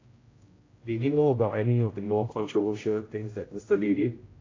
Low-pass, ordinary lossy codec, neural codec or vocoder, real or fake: 7.2 kHz; AAC, 32 kbps; codec, 16 kHz, 0.5 kbps, X-Codec, HuBERT features, trained on general audio; fake